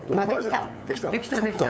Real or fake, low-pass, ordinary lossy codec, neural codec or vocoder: fake; none; none; codec, 16 kHz, 8 kbps, FunCodec, trained on LibriTTS, 25 frames a second